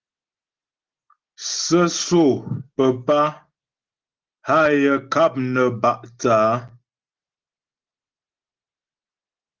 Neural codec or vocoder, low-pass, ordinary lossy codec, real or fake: none; 7.2 kHz; Opus, 16 kbps; real